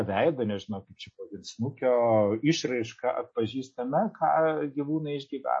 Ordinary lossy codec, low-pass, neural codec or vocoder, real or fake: MP3, 32 kbps; 9.9 kHz; none; real